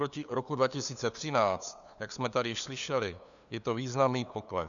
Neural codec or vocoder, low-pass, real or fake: codec, 16 kHz, 2 kbps, FunCodec, trained on LibriTTS, 25 frames a second; 7.2 kHz; fake